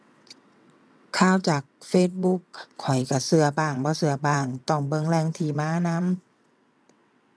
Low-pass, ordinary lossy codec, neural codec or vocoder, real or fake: none; none; vocoder, 22.05 kHz, 80 mel bands, WaveNeXt; fake